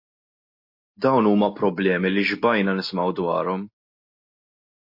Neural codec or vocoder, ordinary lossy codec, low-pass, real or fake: none; MP3, 32 kbps; 5.4 kHz; real